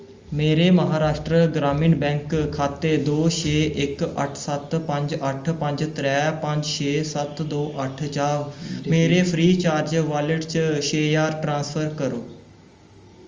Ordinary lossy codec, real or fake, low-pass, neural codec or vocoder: Opus, 32 kbps; real; 7.2 kHz; none